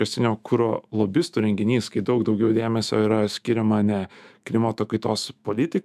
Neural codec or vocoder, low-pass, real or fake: autoencoder, 48 kHz, 128 numbers a frame, DAC-VAE, trained on Japanese speech; 14.4 kHz; fake